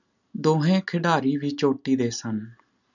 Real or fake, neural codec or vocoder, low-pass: real; none; 7.2 kHz